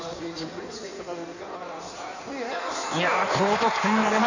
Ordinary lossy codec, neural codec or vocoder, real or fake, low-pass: none; codec, 16 kHz in and 24 kHz out, 1.1 kbps, FireRedTTS-2 codec; fake; 7.2 kHz